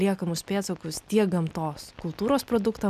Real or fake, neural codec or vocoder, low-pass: real; none; 14.4 kHz